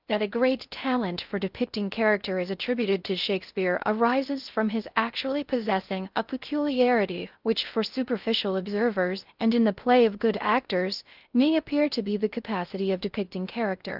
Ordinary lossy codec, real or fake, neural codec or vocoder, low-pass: Opus, 24 kbps; fake; codec, 16 kHz in and 24 kHz out, 0.6 kbps, FocalCodec, streaming, 4096 codes; 5.4 kHz